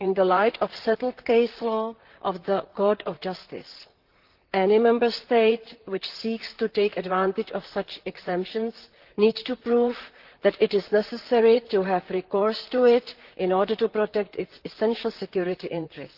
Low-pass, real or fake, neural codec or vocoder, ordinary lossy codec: 5.4 kHz; fake; vocoder, 44.1 kHz, 128 mel bands, Pupu-Vocoder; Opus, 16 kbps